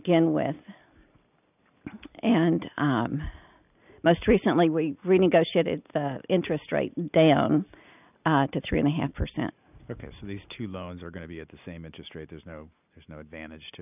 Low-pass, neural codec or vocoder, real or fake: 3.6 kHz; none; real